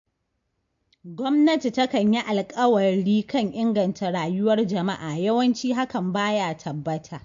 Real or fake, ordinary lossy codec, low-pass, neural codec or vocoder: real; MP3, 48 kbps; 7.2 kHz; none